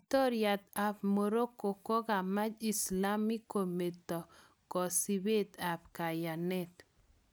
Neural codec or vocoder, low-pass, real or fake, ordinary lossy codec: none; none; real; none